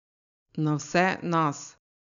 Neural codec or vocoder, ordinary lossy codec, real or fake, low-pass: none; none; real; 7.2 kHz